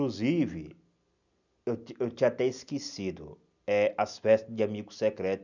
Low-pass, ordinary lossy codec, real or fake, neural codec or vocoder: 7.2 kHz; none; real; none